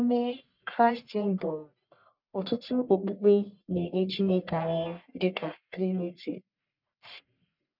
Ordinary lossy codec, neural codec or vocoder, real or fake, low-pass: none; codec, 44.1 kHz, 1.7 kbps, Pupu-Codec; fake; 5.4 kHz